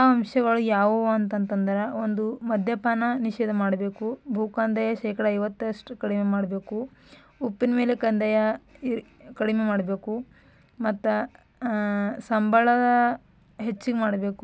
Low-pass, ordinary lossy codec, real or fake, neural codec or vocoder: none; none; real; none